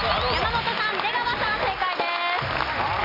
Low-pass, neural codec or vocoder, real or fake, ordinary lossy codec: 5.4 kHz; none; real; AAC, 24 kbps